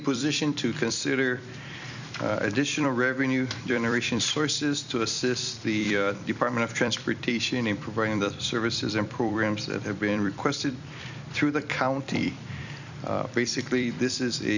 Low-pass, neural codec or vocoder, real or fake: 7.2 kHz; vocoder, 44.1 kHz, 128 mel bands every 256 samples, BigVGAN v2; fake